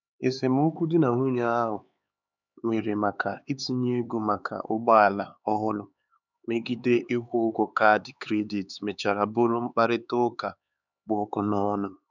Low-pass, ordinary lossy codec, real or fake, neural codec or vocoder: 7.2 kHz; none; fake; codec, 16 kHz, 4 kbps, X-Codec, HuBERT features, trained on LibriSpeech